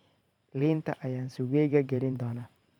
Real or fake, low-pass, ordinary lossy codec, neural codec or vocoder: fake; 19.8 kHz; none; vocoder, 44.1 kHz, 128 mel bands every 512 samples, BigVGAN v2